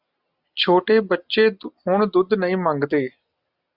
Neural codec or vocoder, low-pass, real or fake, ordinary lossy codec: none; 5.4 kHz; real; Opus, 64 kbps